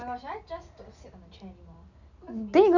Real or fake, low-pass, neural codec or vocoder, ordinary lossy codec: real; 7.2 kHz; none; none